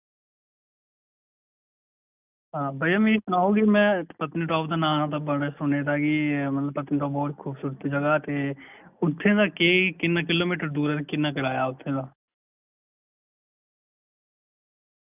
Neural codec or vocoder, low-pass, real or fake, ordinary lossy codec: none; 3.6 kHz; real; none